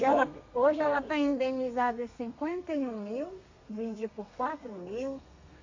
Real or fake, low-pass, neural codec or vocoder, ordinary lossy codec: fake; 7.2 kHz; codec, 32 kHz, 1.9 kbps, SNAC; MP3, 48 kbps